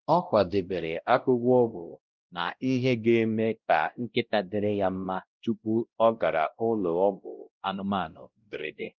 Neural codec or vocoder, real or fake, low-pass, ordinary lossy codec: codec, 16 kHz, 0.5 kbps, X-Codec, WavLM features, trained on Multilingual LibriSpeech; fake; 7.2 kHz; Opus, 32 kbps